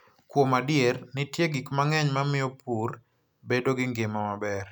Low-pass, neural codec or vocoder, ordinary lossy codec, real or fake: none; none; none; real